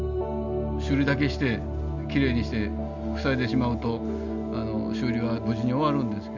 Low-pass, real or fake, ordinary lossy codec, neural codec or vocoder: 7.2 kHz; real; none; none